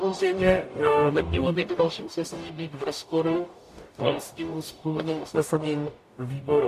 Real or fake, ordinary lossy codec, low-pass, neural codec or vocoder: fake; AAC, 64 kbps; 14.4 kHz; codec, 44.1 kHz, 0.9 kbps, DAC